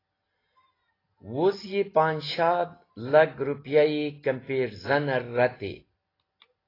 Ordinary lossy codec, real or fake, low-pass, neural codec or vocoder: AAC, 24 kbps; real; 5.4 kHz; none